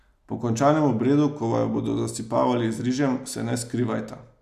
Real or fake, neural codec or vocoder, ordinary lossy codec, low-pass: real; none; none; 14.4 kHz